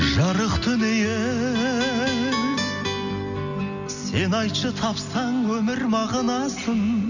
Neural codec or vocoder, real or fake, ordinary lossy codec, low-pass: none; real; none; 7.2 kHz